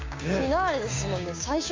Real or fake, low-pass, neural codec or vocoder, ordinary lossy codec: fake; 7.2 kHz; codec, 16 kHz, 6 kbps, DAC; MP3, 48 kbps